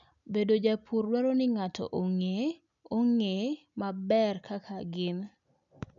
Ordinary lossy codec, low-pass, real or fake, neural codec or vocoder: none; 7.2 kHz; real; none